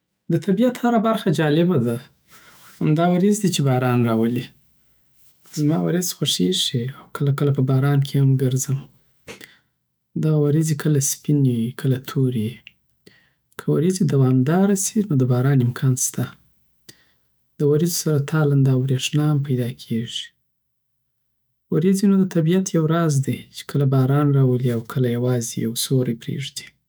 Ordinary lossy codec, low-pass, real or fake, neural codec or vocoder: none; none; fake; autoencoder, 48 kHz, 128 numbers a frame, DAC-VAE, trained on Japanese speech